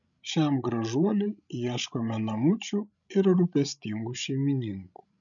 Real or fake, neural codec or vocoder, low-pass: fake; codec, 16 kHz, 16 kbps, FreqCodec, larger model; 7.2 kHz